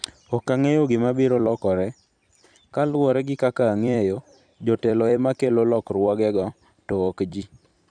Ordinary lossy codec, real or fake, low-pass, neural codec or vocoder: none; fake; 9.9 kHz; vocoder, 44.1 kHz, 128 mel bands every 256 samples, BigVGAN v2